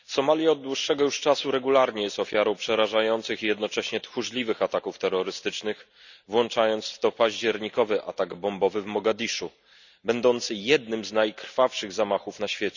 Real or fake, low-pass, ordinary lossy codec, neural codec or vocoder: real; 7.2 kHz; none; none